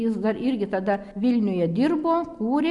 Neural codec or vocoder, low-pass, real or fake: none; 10.8 kHz; real